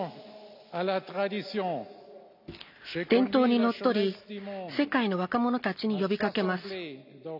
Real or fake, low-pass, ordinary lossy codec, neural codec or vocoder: real; 5.4 kHz; none; none